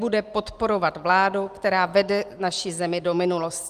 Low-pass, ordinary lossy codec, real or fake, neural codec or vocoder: 14.4 kHz; Opus, 24 kbps; real; none